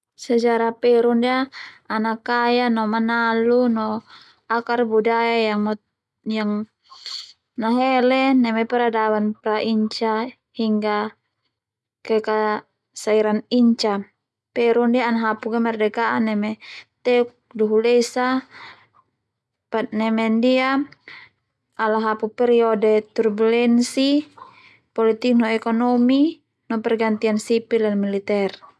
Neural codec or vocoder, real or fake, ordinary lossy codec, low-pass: none; real; none; none